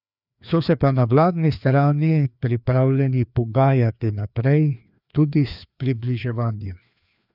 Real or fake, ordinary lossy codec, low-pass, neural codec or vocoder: fake; none; 5.4 kHz; codec, 16 kHz, 2 kbps, FreqCodec, larger model